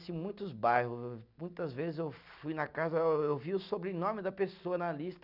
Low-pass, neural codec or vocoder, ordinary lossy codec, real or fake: 5.4 kHz; none; none; real